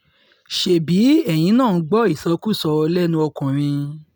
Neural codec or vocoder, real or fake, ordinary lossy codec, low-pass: none; real; none; none